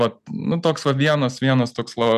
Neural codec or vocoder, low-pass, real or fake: none; 14.4 kHz; real